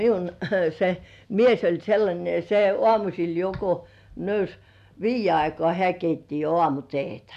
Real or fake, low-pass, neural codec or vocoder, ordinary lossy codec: real; 14.4 kHz; none; none